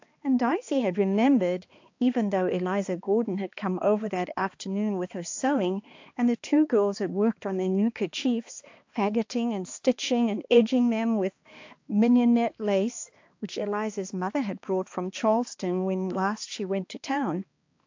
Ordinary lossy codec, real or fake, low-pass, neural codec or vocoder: AAC, 48 kbps; fake; 7.2 kHz; codec, 16 kHz, 2 kbps, X-Codec, HuBERT features, trained on balanced general audio